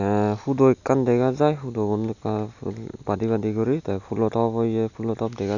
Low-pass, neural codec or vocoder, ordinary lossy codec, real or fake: 7.2 kHz; none; none; real